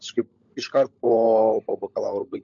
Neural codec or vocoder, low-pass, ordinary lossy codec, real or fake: codec, 16 kHz, 16 kbps, FunCodec, trained on LibriTTS, 50 frames a second; 7.2 kHz; AAC, 64 kbps; fake